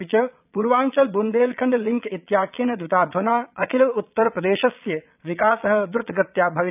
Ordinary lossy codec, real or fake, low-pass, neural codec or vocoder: none; fake; 3.6 kHz; vocoder, 22.05 kHz, 80 mel bands, Vocos